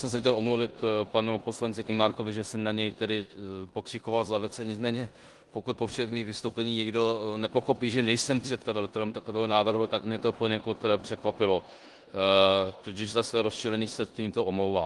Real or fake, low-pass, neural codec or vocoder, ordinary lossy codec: fake; 10.8 kHz; codec, 16 kHz in and 24 kHz out, 0.9 kbps, LongCat-Audio-Codec, four codebook decoder; Opus, 24 kbps